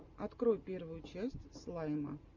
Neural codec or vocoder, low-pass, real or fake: none; 7.2 kHz; real